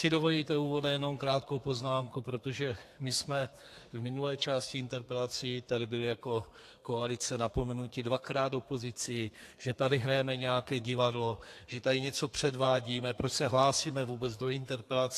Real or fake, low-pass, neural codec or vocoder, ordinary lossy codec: fake; 14.4 kHz; codec, 32 kHz, 1.9 kbps, SNAC; AAC, 64 kbps